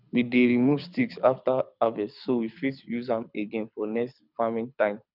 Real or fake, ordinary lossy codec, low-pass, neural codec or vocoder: fake; none; 5.4 kHz; codec, 24 kHz, 6 kbps, HILCodec